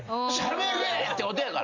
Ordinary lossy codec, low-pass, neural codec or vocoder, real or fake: MP3, 64 kbps; 7.2 kHz; none; real